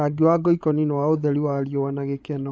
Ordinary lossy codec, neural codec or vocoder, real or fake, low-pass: none; codec, 16 kHz, 16 kbps, FreqCodec, larger model; fake; none